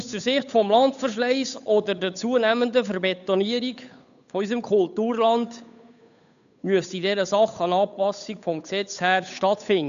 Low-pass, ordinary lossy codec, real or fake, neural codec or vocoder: 7.2 kHz; none; fake; codec, 16 kHz, 8 kbps, FunCodec, trained on Chinese and English, 25 frames a second